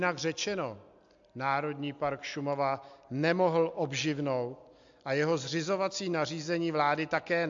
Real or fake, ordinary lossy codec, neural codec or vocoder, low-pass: real; AAC, 64 kbps; none; 7.2 kHz